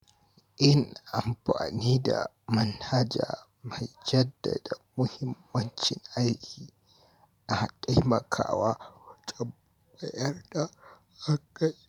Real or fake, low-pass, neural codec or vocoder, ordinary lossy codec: fake; 19.8 kHz; vocoder, 44.1 kHz, 128 mel bands every 512 samples, BigVGAN v2; none